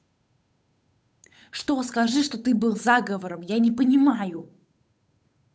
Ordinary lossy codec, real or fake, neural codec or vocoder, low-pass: none; fake; codec, 16 kHz, 8 kbps, FunCodec, trained on Chinese and English, 25 frames a second; none